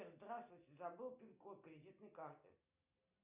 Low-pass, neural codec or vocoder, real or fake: 3.6 kHz; none; real